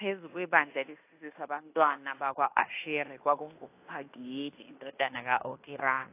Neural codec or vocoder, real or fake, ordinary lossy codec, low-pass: codec, 16 kHz in and 24 kHz out, 0.9 kbps, LongCat-Audio-Codec, fine tuned four codebook decoder; fake; AAC, 24 kbps; 3.6 kHz